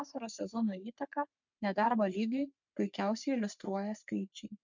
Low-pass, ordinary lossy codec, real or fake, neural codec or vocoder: 7.2 kHz; MP3, 64 kbps; fake; codec, 44.1 kHz, 3.4 kbps, Pupu-Codec